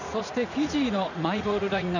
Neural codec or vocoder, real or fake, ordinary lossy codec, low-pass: vocoder, 44.1 kHz, 80 mel bands, Vocos; fake; none; 7.2 kHz